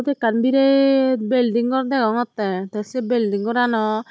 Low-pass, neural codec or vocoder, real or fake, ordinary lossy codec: none; none; real; none